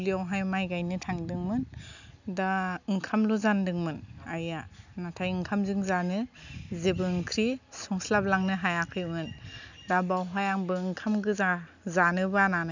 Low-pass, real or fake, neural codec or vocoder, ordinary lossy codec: 7.2 kHz; real; none; none